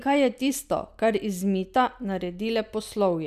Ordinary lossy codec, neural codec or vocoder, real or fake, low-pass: none; none; real; 14.4 kHz